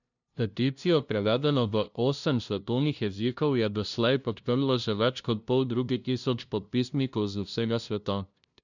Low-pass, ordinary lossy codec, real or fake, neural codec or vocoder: 7.2 kHz; none; fake; codec, 16 kHz, 0.5 kbps, FunCodec, trained on LibriTTS, 25 frames a second